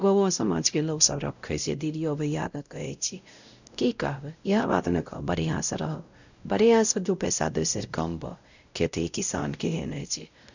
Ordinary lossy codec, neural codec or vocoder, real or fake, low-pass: none; codec, 16 kHz, 0.5 kbps, X-Codec, WavLM features, trained on Multilingual LibriSpeech; fake; 7.2 kHz